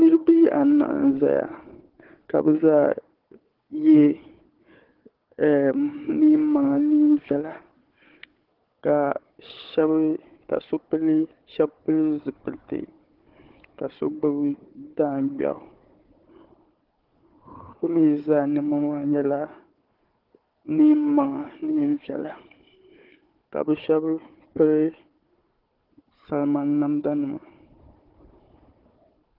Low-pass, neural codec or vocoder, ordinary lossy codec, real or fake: 5.4 kHz; codec, 16 kHz, 4 kbps, FunCodec, trained on Chinese and English, 50 frames a second; Opus, 16 kbps; fake